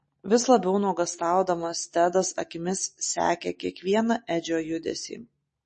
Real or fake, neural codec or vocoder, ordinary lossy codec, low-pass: real; none; MP3, 32 kbps; 10.8 kHz